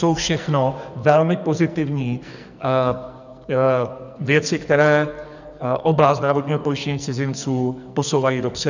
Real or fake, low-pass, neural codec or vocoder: fake; 7.2 kHz; codec, 44.1 kHz, 2.6 kbps, SNAC